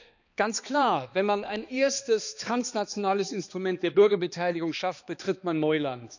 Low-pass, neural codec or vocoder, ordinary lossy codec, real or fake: 7.2 kHz; codec, 16 kHz, 2 kbps, X-Codec, HuBERT features, trained on balanced general audio; none; fake